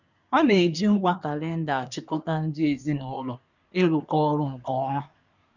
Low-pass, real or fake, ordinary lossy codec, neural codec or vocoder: 7.2 kHz; fake; none; codec, 24 kHz, 1 kbps, SNAC